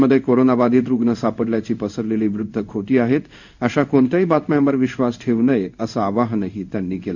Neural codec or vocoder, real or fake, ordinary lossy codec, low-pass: codec, 16 kHz in and 24 kHz out, 1 kbps, XY-Tokenizer; fake; none; 7.2 kHz